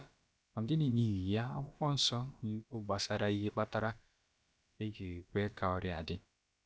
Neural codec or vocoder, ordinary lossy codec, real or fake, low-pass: codec, 16 kHz, about 1 kbps, DyCAST, with the encoder's durations; none; fake; none